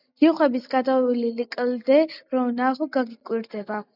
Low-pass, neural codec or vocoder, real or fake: 5.4 kHz; none; real